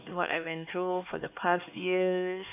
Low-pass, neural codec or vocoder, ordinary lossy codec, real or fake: 3.6 kHz; codec, 16 kHz, 2 kbps, X-Codec, HuBERT features, trained on LibriSpeech; none; fake